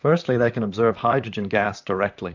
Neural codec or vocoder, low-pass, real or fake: vocoder, 44.1 kHz, 128 mel bands, Pupu-Vocoder; 7.2 kHz; fake